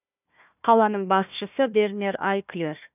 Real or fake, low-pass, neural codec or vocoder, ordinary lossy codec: fake; 3.6 kHz; codec, 16 kHz, 1 kbps, FunCodec, trained on Chinese and English, 50 frames a second; none